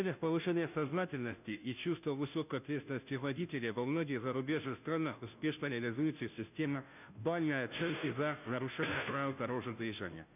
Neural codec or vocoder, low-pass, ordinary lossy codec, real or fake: codec, 16 kHz, 0.5 kbps, FunCodec, trained on Chinese and English, 25 frames a second; 3.6 kHz; none; fake